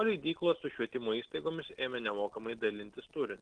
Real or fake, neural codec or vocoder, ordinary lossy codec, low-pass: real; none; Opus, 16 kbps; 9.9 kHz